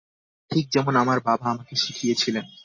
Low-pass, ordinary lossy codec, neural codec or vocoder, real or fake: 7.2 kHz; MP3, 32 kbps; none; real